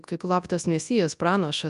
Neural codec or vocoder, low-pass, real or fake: codec, 24 kHz, 0.9 kbps, WavTokenizer, large speech release; 10.8 kHz; fake